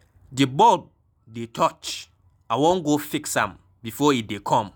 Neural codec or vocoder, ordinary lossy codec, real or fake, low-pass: none; none; real; none